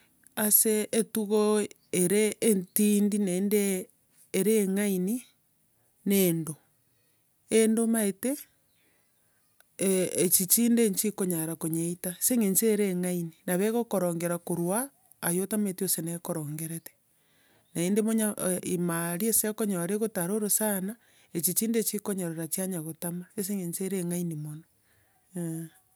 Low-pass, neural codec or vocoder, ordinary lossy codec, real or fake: none; none; none; real